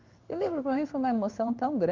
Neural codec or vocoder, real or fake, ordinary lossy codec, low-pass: codec, 16 kHz in and 24 kHz out, 1 kbps, XY-Tokenizer; fake; Opus, 32 kbps; 7.2 kHz